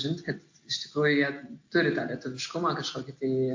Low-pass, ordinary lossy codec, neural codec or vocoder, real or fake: 7.2 kHz; AAC, 48 kbps; none; real